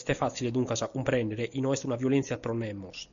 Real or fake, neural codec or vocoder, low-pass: real; none; 7.2 kHz